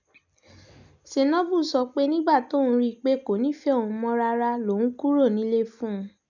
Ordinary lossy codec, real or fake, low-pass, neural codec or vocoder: none; real; 7.2 kHz; none